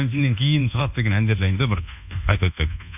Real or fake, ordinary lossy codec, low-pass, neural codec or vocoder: fake; none; 3.6 kHz; codec, 24 kHz, 1.2 kbps, DualCodec